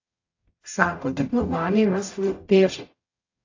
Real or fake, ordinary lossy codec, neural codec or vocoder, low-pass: fake; AAC, 48 kbps; codec, 44.1 kHz, 0.9 kbps, DAC; 7.2 kHz